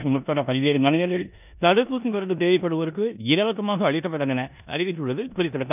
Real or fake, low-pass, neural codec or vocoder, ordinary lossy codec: fake; 3.6 kHz; codec, 16 kHz in and 24 kHz out, 0.9 kbps, LongCat-Audio-Codec, four codebook decoder; none